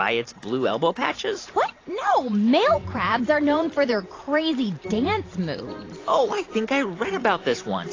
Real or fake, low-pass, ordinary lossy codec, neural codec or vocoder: fake; 7.2 kHz; AAC, 32 kbps; vocoder, 44.1 kHz, 128 mel bands every 512 samples, BigVGAN v2